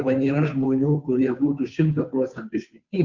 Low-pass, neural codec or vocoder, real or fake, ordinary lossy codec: 7.2 kHz; codec, 16 kHz, 2 kbps, FunCodec, trained on Chinese and English, 25 frames a second; fake; Opus, 64 kbps